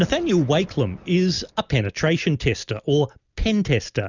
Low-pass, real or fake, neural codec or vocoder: 7.2 kHz; real; none